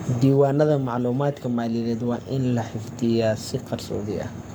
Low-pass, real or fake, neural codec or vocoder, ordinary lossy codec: none; fake; codec, 44.1 kHz, 7.8 kbps, Pupu-Codec; none